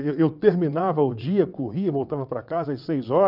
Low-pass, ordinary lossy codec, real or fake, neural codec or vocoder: 5.4 kHz; none; fake; vocoder, 22.05 kHz, 80 mel bands, WaveNeXt